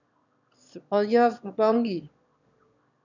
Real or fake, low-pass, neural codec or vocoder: fake; 7.2 kHz; autoencoder, 22.05 kHz, a latent of 192 numbers a frame, VITS, trained on one speaker